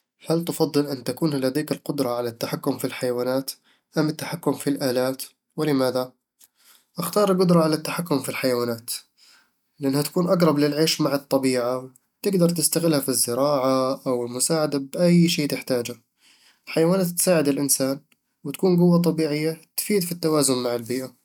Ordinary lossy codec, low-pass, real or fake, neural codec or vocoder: none; 19.8 kHz; real; none